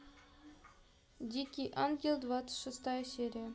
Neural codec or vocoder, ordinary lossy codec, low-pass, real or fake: none; none; none; real